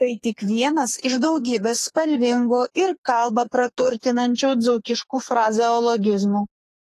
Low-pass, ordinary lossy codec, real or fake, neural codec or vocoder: 14.4 kHz; AAC, 48 kbps; fake; codec, 32 kHz, 1.9 kbps, SNAC